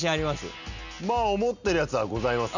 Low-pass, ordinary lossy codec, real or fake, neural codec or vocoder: 7.2 kHz; none; real; none